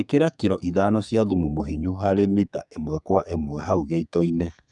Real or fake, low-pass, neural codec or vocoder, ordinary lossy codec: fake; 10.8 kHz; codec, 32 kHz, 1.9 kbps, SNAC; none